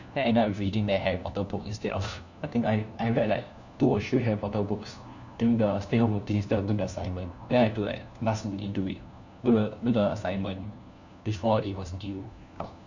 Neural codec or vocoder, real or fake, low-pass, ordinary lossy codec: codec, 16 kHz, 1 kbps, FunCodec, trained on LibriTTS, 50 frames a second; fake; 7.2 kHz; none